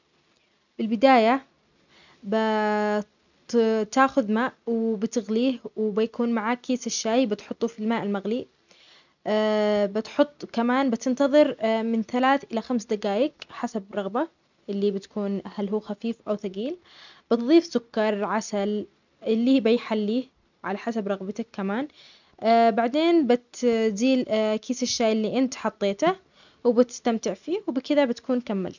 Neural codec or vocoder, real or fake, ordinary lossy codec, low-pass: none; real; none; 7.2 kHz